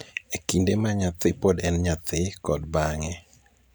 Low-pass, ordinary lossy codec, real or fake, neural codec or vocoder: none; none; real; none